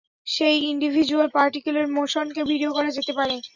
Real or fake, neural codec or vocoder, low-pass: real; none; 7.2 kHz